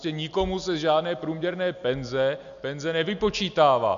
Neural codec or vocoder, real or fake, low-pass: none; real; 7.2 kHz